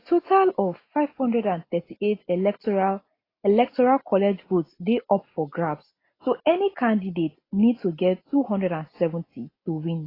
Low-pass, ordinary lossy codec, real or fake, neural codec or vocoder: 5.4 kHz; AAC, 24 kbps; real; none